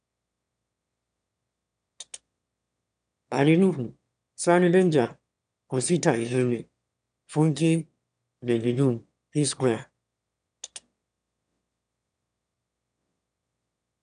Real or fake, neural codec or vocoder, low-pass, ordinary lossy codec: fake; autoencoder, 22.05 kHz, a latent of 192 numbers a frame, VITS, trained on one speaker; 9.9 kHz; none